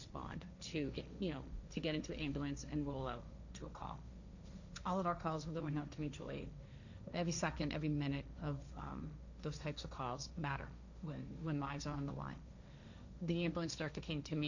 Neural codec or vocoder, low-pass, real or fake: codec, 16 kHz, 1.1 kbps, Voila-Tokenizer; 7.2 kHz; fake